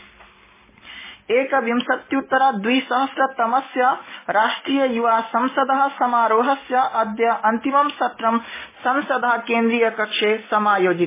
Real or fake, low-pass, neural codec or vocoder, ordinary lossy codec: real; 3.6 kHz; none; MP3, 16 kbps